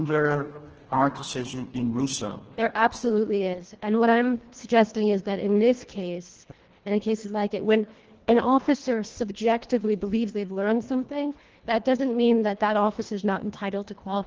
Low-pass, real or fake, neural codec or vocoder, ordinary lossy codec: 7.2 kHz; fake; codec, 24 kHz, 1.5 kbps, HILCodec; Opus, 16 kbps